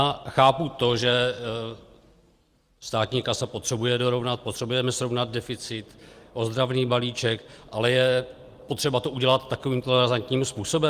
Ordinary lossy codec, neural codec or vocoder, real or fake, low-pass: Opus, 32 kbps; none; real; 14.4 kHz